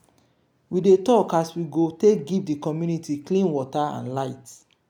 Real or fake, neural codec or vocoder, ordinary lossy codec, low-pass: real; none; none; 19.8 kHz